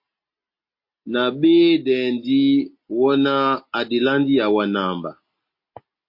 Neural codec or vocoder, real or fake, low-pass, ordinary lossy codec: none; real; 5.4 kHz; MP3, 32 kbps